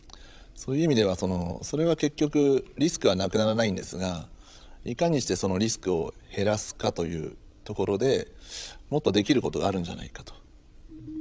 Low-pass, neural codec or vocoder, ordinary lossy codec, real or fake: none; codec, 16 kHz, 16 kbps, FreqCodec, larger model; none; fake